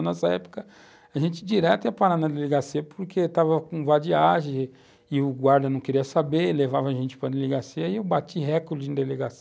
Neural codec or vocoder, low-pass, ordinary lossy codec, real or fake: none; none; none; real